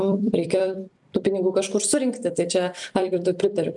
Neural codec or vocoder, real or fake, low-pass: vocoder, 44.1 kHz, 128 mel bands every 256 samples, BigVGAN v2; fake; 10.8 kHz